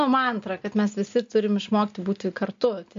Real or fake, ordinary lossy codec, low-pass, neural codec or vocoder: real; MP3, 48 kbps; 7.2 kHz; none